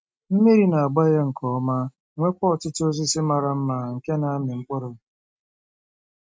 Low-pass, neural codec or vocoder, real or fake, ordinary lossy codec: none; none; real; none